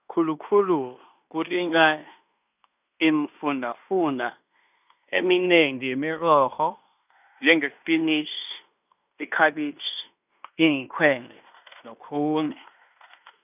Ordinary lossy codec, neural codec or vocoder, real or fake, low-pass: none; codec, 16 kHz in and 24 kHz out, 0.9 kbps, LongCat-Audio-Codec, fine tuned four codebook decoder; fake; 3.6 kHz